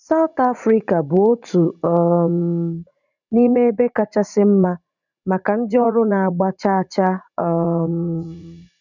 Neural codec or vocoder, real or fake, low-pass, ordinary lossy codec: vocoder, 44.1 kHz, 80 mel bands, Vocos; fake; 7.2 kHz; none